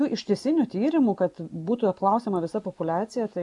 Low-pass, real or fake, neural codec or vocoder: 10.8 kHz; real; none